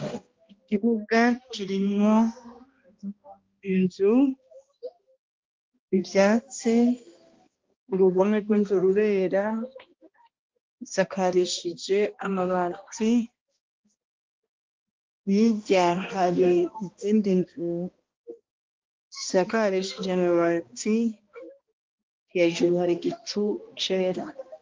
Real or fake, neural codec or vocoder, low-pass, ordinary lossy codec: fake; codec, 16 kHz, 1 kbps, X-Codec, HuBERT features, trained on balanced general audio; 7.2 kHz; Opus, 32 kbps